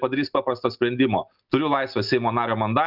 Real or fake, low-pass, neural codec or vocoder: real; 5.4 kHz; none